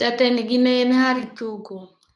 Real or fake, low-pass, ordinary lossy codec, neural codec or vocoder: fake; 10.8 kHz; none; codec, 24 kHz, 0.9 kbps, WavTokenizer, medium speech release version 2